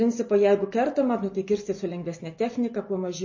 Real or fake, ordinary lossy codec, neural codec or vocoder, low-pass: real; MP3, 32 kbps; none; 7.2 kHz